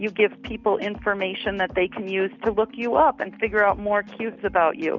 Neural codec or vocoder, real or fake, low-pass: none; real; 7.2 kHz